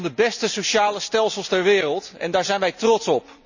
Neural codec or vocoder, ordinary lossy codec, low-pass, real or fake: none; none; 7.2 kHz; real